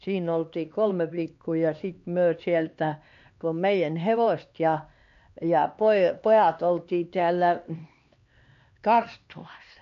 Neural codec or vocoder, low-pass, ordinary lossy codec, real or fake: codec, 16 kHz, 2 kbps, X-Codec, HuBERT features, trained on LibriSpeech; 7.2 kHz; MP3, 48 kbps; fake